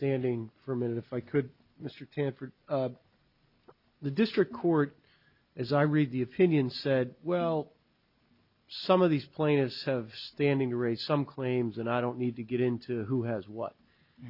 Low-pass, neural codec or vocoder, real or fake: 5.4 kHz; none; real